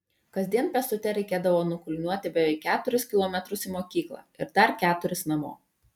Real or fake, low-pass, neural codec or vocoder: real; 19.8 kHz; none